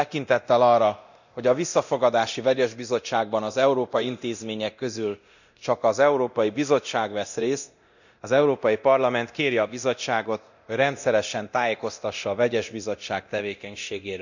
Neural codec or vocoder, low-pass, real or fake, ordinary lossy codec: codec, 24 kHz, 0.9 kbps, DualCodec; 7.2 kHz; fake; none